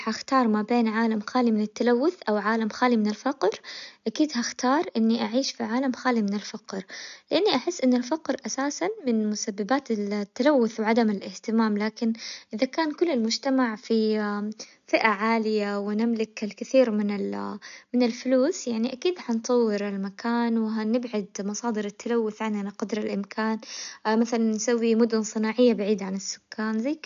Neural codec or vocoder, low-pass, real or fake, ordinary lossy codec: none; 7.2 kHz; real; MP3, 96 kbps